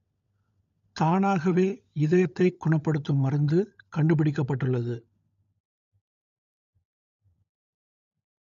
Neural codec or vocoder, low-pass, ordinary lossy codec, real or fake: codec, 16 kHz, 16 kbps, FunCodec, trained on LibriTTS, 50 frames a second; 7.2 kHz; none; fake